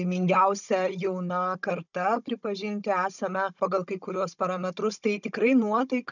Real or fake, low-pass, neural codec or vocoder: fake; 7.2 kHz; codec, 16 kHz, 16 kbps, FunCodec, trained on Chinese and English, 50 frames a second